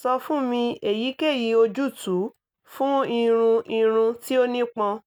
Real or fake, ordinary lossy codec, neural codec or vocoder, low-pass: real; none; none; none